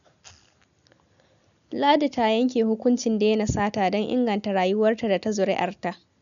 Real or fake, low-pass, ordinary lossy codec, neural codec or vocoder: real; 7.2 kHz; none; none